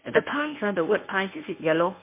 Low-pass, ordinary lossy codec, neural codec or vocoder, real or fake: 3.6 kHz; MP3, 24 kbps; codec, 24 kHz, 0.9 kbps, WavTokenizer, medium speech release version 1; fake